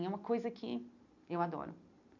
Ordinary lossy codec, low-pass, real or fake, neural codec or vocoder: none; 7.2 kHz; real; none